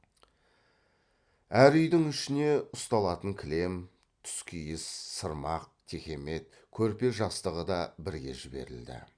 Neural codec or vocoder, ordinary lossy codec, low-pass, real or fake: none; Opus, 64 kbps; 9.9 kHz; real